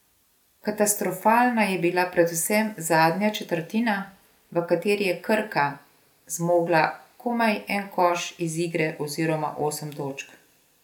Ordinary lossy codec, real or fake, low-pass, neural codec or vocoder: none; real; 19.8 kHz; none